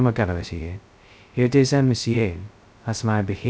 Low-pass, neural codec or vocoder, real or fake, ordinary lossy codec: none; codec, 16 kHz, 0.2 kbps, FocalCodec; fake; none